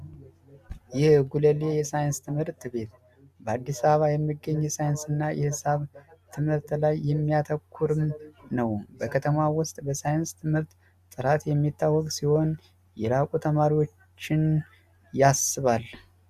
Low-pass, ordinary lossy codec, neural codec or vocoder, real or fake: 14.4 kHz; Opus, 64 kbps; none; real